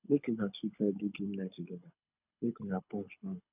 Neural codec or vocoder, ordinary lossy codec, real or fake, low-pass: codec, 24 kHz, 6 kbps, HILCodec; none; fake; 3.6 kHz